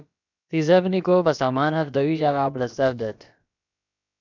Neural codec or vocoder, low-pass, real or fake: codec, 16 kHz, about 1 kbps, DyCAST, with the encoder's durations; 7.2 kHz; fake